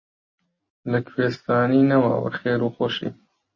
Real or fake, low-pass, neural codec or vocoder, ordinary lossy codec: real; 7.2 kHz; none; MP3, 32 kbps